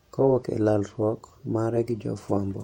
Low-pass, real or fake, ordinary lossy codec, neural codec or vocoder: 19.8 kHz; fake; MP3, 64 kbps; vocoder, 48 kHz, 128 mel bands, Vocos